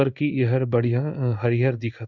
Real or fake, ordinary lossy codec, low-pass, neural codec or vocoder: fake; none; 7.2 kHz; codec, 16 kHz in and 24 kHz out, 1 kbps, XY-Tokenizer